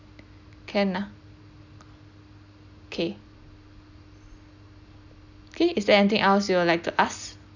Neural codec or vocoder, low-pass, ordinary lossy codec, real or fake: none; 7.2 kHz; none; real